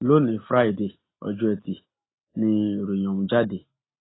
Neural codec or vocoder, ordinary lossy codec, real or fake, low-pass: none; AAC, 16 kbps; real; 7.2 kHz